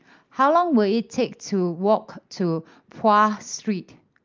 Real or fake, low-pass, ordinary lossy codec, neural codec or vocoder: real; 7.2 kHz; Opus, 24 kbps; none